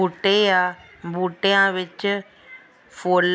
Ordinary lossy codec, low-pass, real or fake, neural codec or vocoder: none; none; real; none